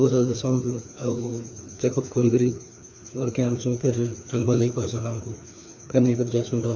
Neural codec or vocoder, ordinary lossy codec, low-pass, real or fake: codec, 16 kHz, 2 kbps, FreqCodec, larger model; none; none; fake